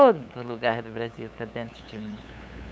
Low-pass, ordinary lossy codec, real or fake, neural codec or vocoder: none; none; fake; codec, 16 kHz, 8 kbps, FunCodec, trained on LibriTTS, 25 frames a second